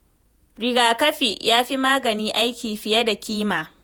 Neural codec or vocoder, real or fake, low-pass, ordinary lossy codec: vocoder, 48 kHz, 128 mel bands, Vocos; fake; none; none